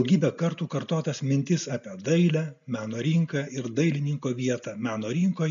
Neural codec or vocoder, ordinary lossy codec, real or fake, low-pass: none; MP3, 96 kbps; real; 7.2 kHz